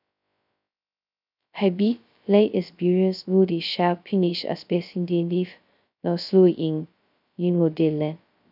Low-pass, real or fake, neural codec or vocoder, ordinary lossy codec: 5.4 kHz; fake; codec, 16 kHz, 0.2 kbps, FocalCodec; none